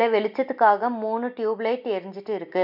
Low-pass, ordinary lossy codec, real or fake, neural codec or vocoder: 5.4 kHz; none; real; none